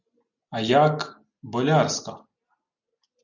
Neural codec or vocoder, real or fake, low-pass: none; real; 7.2 kHz